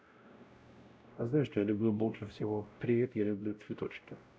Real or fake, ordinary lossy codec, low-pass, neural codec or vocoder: fake; none; none; codec, 16 kHz, 0.5 kbps, X-Codec, WavLM features, trained on Multilingual LibriSpeech